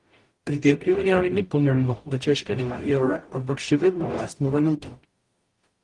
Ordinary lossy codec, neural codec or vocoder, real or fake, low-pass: Opus, 24 kbps; codec, 44.1 kHz, 0.9 kbps, DAC; fake; 10.8 kHz